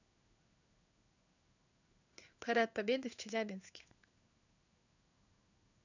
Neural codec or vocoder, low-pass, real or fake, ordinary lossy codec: codec, 16 kHz in and 24 kHz out, 1 kbps, XY-Tokenizer; 7.2 kHz; fake; none